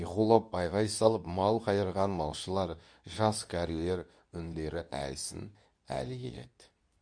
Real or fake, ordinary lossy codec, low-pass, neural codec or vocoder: fake; none; 9.9 kHz; codec, 24 kHz, 0.9 kbps, WavTokenizer, medium speech release version 2